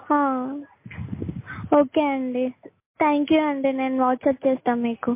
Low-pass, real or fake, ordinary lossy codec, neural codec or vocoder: 3.6 kHz; real; MP3, 24 kbps; none